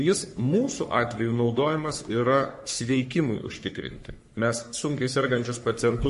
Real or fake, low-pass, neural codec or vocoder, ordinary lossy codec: fake; 14.4 kHz; codec, 44.1 kHz, 3.4 kbps, Pupu-Codec; MP3, 48 kbps